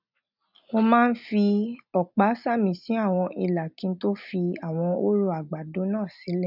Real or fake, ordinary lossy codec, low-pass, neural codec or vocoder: real; none; 5.4 kHz; none